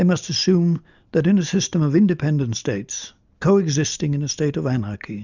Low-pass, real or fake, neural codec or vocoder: 7.2 kHz; real; none